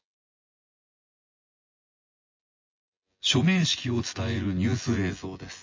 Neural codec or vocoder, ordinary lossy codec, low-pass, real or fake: vocoder, 24 kHz, 100 mel bands, Vocos; MP3, 32 kbps; 7.2 kHz; fake